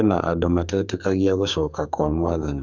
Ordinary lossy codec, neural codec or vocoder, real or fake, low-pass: none; codec, 44.1 kHz, 2.6 kbps, SNAC; fake; 7.2 kHz